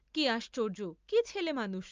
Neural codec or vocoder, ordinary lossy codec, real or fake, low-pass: none; Opus, 24 kbps; real; 7.2 kHz